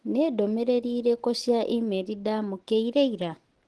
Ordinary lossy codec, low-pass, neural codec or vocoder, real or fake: Opus, 16 kbps; 10.8 kHz; none; real